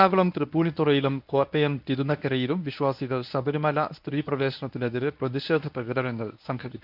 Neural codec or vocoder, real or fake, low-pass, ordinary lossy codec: codec, 24 kHz, 0.9 kbps, WavTokenizer, medium speech release version 1; fake; 5.4 kHz; none